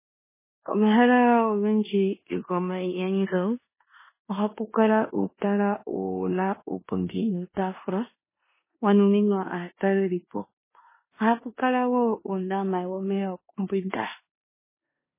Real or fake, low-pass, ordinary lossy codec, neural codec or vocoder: fake; 3.6 kHz; MP3, 16 kbps; codec, 16 kHz in and 24 kHz out, 0.9 kbps, LongCat-Audio-Codec, four codebook decoder